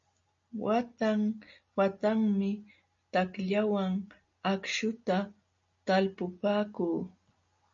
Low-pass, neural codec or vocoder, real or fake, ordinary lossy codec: 7.2 kHz; none; real; MP3, 48 kbps